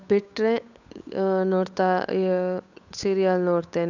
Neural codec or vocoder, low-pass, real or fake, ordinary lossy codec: codec, 16 kHz, 8 kbps, FunCodec, trained on Chinese and English, 25 frames a second; 7.2 kHz; fake; none